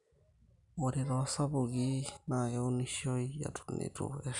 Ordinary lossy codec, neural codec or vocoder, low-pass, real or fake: none; none; 10.8 kHz; real